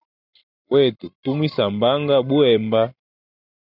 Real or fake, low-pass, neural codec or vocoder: real; 5.4 kHz; none